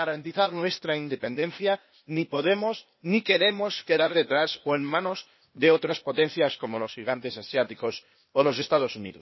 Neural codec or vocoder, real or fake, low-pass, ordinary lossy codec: codec, 16 kHz, 0.8 kbps, ZipCodec; fake; 7.2 kHz; MP3, 24 kbps